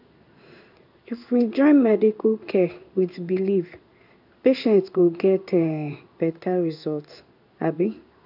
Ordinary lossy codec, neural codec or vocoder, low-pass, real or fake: none; codec, 16 kHz in and 24 kHz out, 1 kbps, XY-Tokenizer; 5.4 kHz; fake